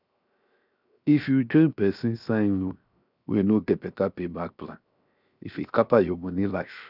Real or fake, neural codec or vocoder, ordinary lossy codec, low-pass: fake; codec, 16 kHz, 0.7 kbps, FocalCodec; none; 5.4 kHz